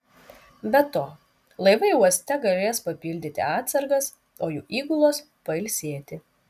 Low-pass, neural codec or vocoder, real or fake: 14.4 kHz; none; real